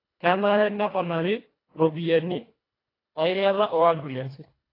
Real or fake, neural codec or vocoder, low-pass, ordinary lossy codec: fake; codec, 24 kHz, 1.5 kbps, HILCodec; 5.4 kHz; AAC, 24 kbps